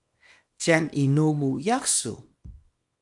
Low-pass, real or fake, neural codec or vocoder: 10.8 kHz; fake; codec, 24 kHz, 0.9 kbps, WavTokenizer, small release